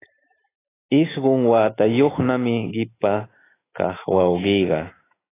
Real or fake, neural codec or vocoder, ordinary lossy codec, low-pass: fake; autoencoder, 48 kHz, 128 numbers a frame, DAC-VAE, trained on Japanese speech; AAC, 16 kbps; 3.6 kHz